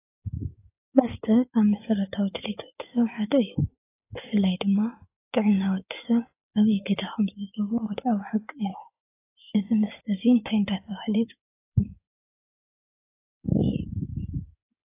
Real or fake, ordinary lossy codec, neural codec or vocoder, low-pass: fake; AAC, 24 kbps; codec, 16 kHz in and 24 kHz out, 2.2 kbps, FireRedTTS-2 codec; 3.6 kHz